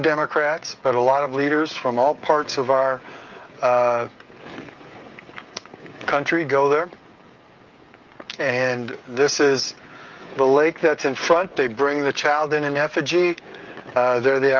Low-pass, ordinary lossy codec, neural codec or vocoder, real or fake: 7.2 kHz; Opus, 32 kbps; codec, 16 kHz, 16 kbps, FreqCodec, smaller model; fake